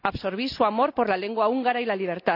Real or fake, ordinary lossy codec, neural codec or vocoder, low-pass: real; none; none; 5.4 kHz